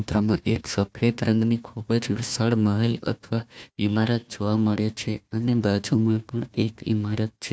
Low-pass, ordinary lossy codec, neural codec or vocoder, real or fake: none; none; codec, 16 kHz, 1 kbps, FunCodec, trained on Chinese and English, 50 frames a second; fake